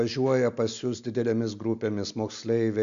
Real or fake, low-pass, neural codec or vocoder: real; 7.2 kHz; none